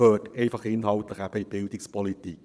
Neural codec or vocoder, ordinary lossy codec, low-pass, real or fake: vocoder, 22.05 kHz, 80 mel bands, Vocos; none; none; fake